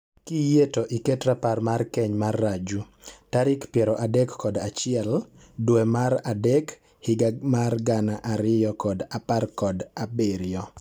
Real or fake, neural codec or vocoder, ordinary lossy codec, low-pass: real; none; none; none